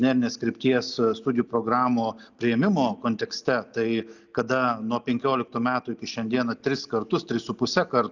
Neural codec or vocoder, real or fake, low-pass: none; real; 7.2 kHz